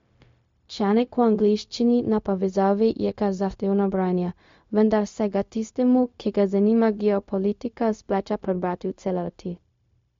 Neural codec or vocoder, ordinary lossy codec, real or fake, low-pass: codec, 16 kHz, 0.4 kbps, LongCat-Audio-Codec; MP3, 48 kbps; fake; 7.2 kHz